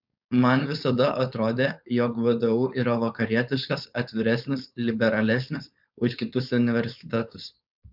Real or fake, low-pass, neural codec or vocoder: fake; 5.4 kHz; codec, 16 kHz, 4.8 kbps, FACodec